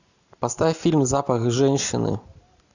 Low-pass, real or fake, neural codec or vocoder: 7.2 kHz; real; none